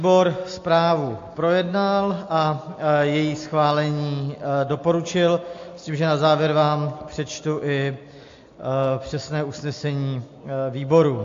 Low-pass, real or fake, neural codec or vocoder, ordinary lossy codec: 7.2 kHz; real; none; AAC, 48 kbps